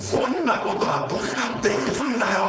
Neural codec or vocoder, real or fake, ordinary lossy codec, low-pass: codec, 16 kHz, 4.8 kbps, FACodec; fake; none; none